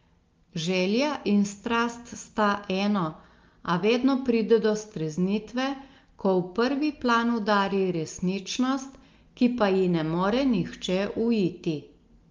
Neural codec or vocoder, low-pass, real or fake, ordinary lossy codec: none; 7.2 kHz; real; Opus, 32 kbps